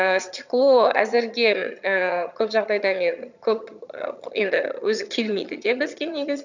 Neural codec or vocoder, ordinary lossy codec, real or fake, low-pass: vocoder, 22.05 kHz, 80 mel bands, HiFi-GAN; none; fake; 7.2 kHz